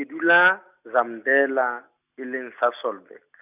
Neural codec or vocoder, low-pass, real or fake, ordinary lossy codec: none; 3.6 kHz; real; none